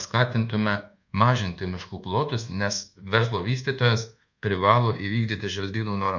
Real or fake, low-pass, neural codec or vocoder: fake; 7.2 kHz; codec, 24 kHz, 1.2 kbps, DualCodec